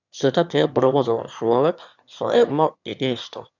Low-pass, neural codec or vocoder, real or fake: 7.2 kHz; autoencoder, 22.05 kHz, a latent of 192 numbers a frame, VITS, trained on one speaker; fake